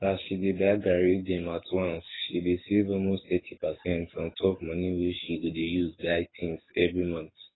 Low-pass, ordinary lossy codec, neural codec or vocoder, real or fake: 7.2 kHz; AAC, 16 kbps; codec, 24 kHz, 6 kbps, HILCodec; fake